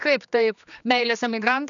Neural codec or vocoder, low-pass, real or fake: codec, 16 kHz, 2 kbps, X-Codec, HuBERT features, trained on general audio; 7.2 kHz; fake